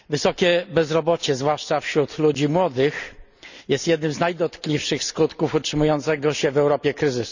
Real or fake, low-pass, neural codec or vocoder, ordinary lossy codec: real; 7.2 kHz; none; none